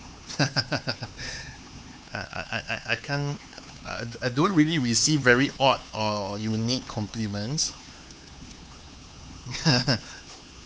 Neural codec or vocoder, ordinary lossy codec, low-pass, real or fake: codec, 16 kHz, 4 kbps, X-Codec, HuBERT features, trained on LibriSpeech; none; none; fake